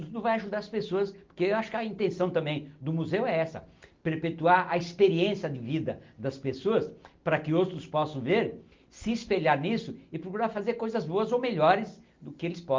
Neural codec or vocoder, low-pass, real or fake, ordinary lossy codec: none; 7.2 kHz; real; Opus, 24 kbps